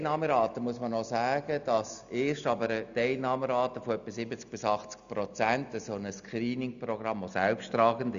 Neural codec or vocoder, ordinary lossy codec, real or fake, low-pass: none; none; real; 7.2 kHz